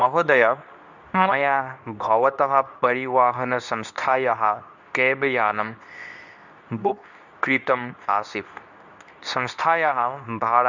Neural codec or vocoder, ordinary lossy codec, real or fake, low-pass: codec, 24 kHz, 0.9 kbps, WavTokenizer, medium speech release version 2; none; fake; 7.2 kHz